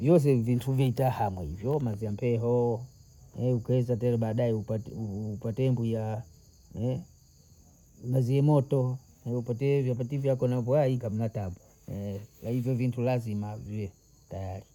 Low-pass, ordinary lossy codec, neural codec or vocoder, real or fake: 19.8 kHz; none; none; real